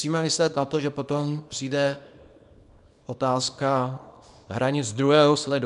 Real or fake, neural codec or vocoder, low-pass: fake; codec, 24 kHz, 0.9 kbps, WavTokenizer, small release; 10.8 kHz